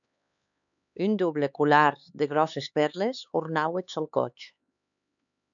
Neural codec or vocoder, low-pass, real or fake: codec, 16 kHz, 4 kbps, X-Codec, HuBERT features, trained on LibriSpeech; 7.2 kHz; fake